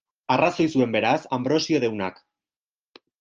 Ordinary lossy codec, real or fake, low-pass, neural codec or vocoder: Opus, 24 kbps; real; 7.2 kHz; none